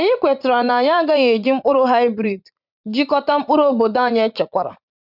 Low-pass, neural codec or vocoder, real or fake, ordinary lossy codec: 5.4 kHz; vocoder, 44.1 kHz, 80 mel bands, Vocos; fake; none